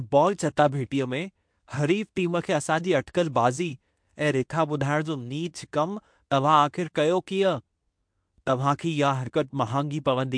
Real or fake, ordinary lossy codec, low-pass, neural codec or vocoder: fake; MP3, 64 kbps; 9.9 kHz; codec, 24 kHz, 0.9 kbps, WavTokenizer, medium speech release version 2